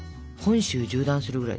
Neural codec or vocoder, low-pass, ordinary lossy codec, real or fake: none; none; none; real